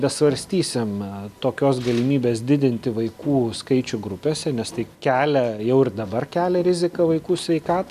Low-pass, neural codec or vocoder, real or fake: 14.4 kHz; none; real